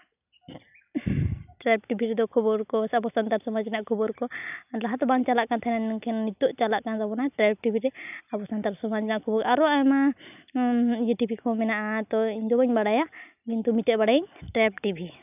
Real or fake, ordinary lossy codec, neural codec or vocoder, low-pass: real; none; none; 3.6 kHz